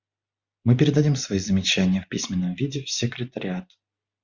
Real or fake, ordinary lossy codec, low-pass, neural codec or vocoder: real; Opus, 64 kbps; 7.2 kHz; none